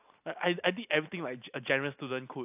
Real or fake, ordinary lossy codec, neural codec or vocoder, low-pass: real; AAC, 32 kbps; none; 3.6 kHz